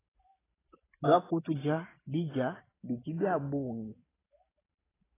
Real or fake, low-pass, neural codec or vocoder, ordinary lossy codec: fake; 3.6 kHz; vocoder, 44.1 kHz, 128 mel bands every 512 samples, BigVGAN v2; AAC, 16 kbps